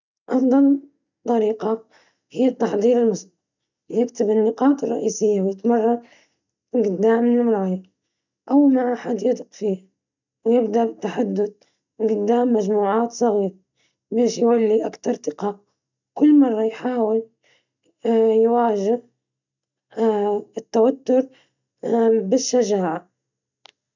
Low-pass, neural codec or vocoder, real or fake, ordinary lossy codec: 7.2 kHz; none; real; none